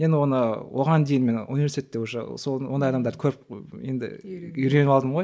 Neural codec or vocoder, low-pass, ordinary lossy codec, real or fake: none; none; none; real